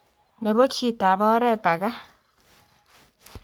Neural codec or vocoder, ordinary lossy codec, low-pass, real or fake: codec, 44.1 kHz, 3.4 kbps, Pupu-Codec; none; none; fake